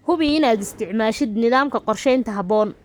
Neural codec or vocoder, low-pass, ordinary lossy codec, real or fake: codec, 44.1 kHz, 7.8 kbps, Pupu-Codec; none; none; fake